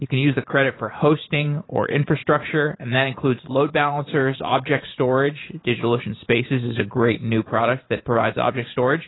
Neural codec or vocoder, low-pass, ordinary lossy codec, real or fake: vocoder, 44.1 kHz, 128 mel bands every 512 samples, BigVGAN v2; 7.2 kHz; AAC, 16 kbps; fake